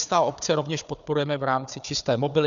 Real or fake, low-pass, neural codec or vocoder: fake; 7.2 kHz; codec, 16 kHz, 4 kbps, FunCodec, trained on Chinese and English, 50 frames a second